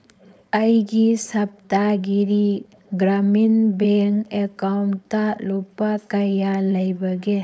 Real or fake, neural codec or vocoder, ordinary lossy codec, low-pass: fake; codec, 16 kHz, 4.8 kbps, FACodec; none; none